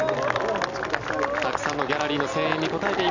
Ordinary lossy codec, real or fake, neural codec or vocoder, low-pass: none; real; none; 7.2 kHz